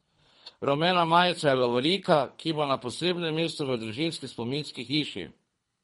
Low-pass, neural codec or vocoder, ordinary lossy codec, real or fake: 10.8 kHz; codec, 24 kHz, 3 kbps, HILCodec; MP3, 48 kbps; fake